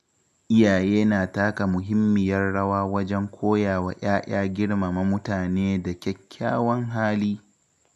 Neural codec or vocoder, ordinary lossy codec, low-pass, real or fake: none; none; 14.4 kHz; real